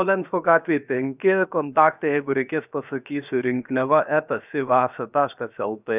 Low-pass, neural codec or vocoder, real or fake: 3.6 kHz; codec, 16 kHz, 0.7 kbps, FocalCodec; fake